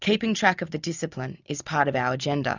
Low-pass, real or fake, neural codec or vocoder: 7.2 kHz; real; none